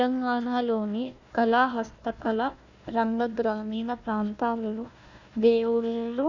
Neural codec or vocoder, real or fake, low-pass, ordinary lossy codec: codec, 16 kHz, 1 kbps, FunCodec, trained on Chinese and English, 50 frames a second; fake; 7.2 kHz; none